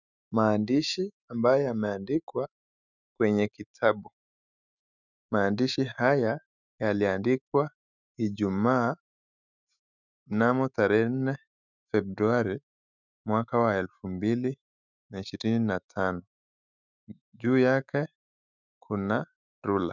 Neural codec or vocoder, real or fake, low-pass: autoencoder, 48 kHz, 128 numbers a frame, DAC-VAE, trained on Japanese speech; fake; 7.2 kHz